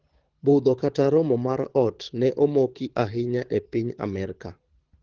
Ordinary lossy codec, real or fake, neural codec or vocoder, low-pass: Opus, 16 kbps; fake; codec, 24 kHz, 6 kbps, HILCodec; 7.2 kHz